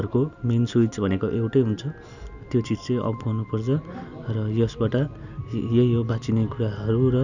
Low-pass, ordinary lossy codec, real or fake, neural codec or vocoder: 7.2 kHz; none; real; none